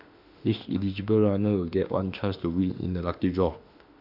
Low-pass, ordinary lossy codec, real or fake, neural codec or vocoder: 5.4 kHz; none; fake; autoencoder, 48 kHz, 32 numbers a frame, DAC-VAE, trained on Japanese speech